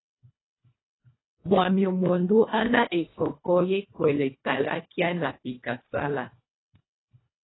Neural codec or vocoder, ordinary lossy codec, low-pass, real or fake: codec, 24 kHz, 1.5 kbps, HILCodec; AAC, 16 kbps; 7.2 kHz; fake